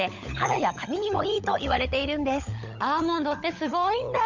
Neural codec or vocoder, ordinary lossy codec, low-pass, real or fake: codec, 16 kHz, 16 kbps, FunCodec, trained on LibriTTS, 50 frames a second; none; 7.2 kHz; fake